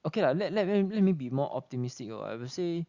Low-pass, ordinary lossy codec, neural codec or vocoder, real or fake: 7.2 kHz; Opus, 64 kbps; none; real